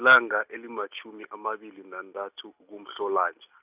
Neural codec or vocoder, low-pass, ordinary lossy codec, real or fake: none; 3.6 kHz; none; real